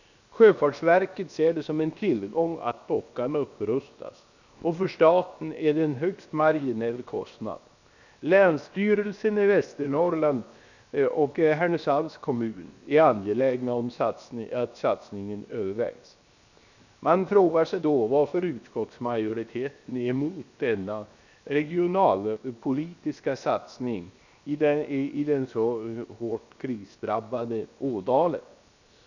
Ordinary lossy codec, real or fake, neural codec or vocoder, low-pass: none; fake; codec, 16 kHz, 0.7 kbps, FocalCodec; 7.2 kHz